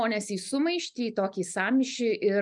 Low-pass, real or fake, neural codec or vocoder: 10.8 kHz; real; none